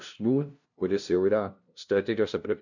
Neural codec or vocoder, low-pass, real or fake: codec, 16 kHz, 0.5 kbps, FunCodec, trained on LibriTTS, 25 frames a second; 7.2 kHz; fake